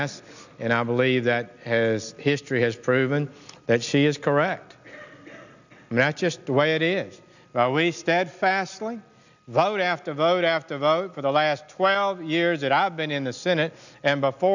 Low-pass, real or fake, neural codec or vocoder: 7.2 kHz; real; none